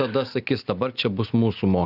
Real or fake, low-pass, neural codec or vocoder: real; 5.4 kHz; none